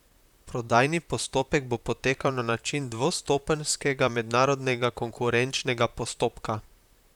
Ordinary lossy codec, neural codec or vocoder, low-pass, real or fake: none; vocoder, 44.1 kHz, 128 mel bands, Pupu-Vocoder; 19.8 kHz; fake